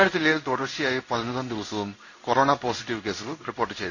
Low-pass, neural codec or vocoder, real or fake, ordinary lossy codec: 7.2 kHz; codec, 16 kHz in and 24 kHz out, 1 kbps, XY-Tokenizer; fake; none